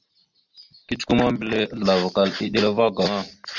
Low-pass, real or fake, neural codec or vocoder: 7.2 kHz; real; none